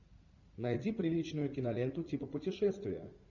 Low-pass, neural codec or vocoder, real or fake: 7.2 kHz; vocoder, 44.1 kHz, 80 mel bands, Vocos; fake